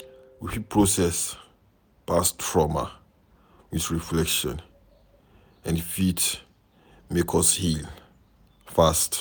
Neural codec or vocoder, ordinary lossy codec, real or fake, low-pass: none; none; real; none